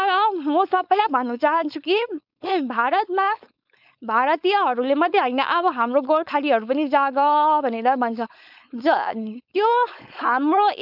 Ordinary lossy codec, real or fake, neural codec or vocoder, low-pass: none; fake; codec, 16 kHz, 4.8 kbps, FACodec; 5.4 kHz